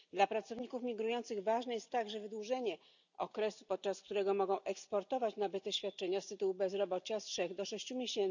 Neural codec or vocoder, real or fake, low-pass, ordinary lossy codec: none; real; 7.2 kHz; none